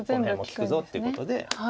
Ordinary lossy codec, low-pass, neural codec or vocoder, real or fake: none; none; none; real